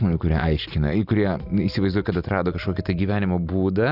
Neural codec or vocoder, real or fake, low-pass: none; real; 5.4 kHz